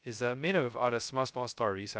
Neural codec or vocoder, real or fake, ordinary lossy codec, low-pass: codec, 16 kHz, 0.2 kbps, FocalCodec; fake; none; none